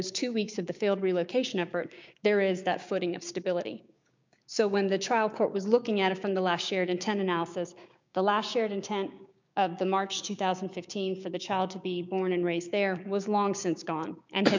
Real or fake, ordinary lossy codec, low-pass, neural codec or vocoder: fake; MP3, 64 kbps; 7.2 kHz; codec, 16 kHz, 6 kbps, DAC